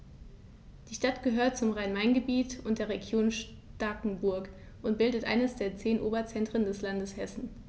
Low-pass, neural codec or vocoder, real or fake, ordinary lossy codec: none; none; real; none